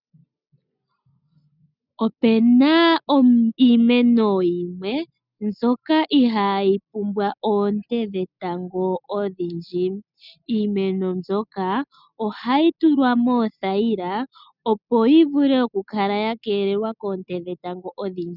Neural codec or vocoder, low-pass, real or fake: none; 5.4 kHz; real